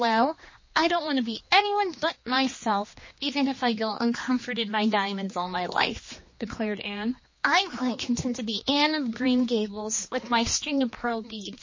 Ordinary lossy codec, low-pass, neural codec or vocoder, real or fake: MP3, 32 kbps; 7.2 kHz; codec, 16 kHz, 2 kbps, X-Codec, HuBERT features, trained on general audio; fake